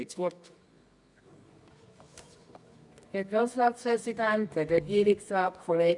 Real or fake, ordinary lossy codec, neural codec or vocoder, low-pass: fake; none; codec, 24 kHz, 0.9 kbps, WavTokenizer, medium music audio release; 10.8 kHz